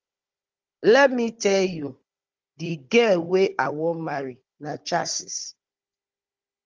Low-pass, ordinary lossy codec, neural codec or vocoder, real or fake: 7.2 kHz; Opus, 32 kbps; codec, 16 kHz, 16 kbps, FunCodec, trained on Chinese and English, 50 frames a second; fake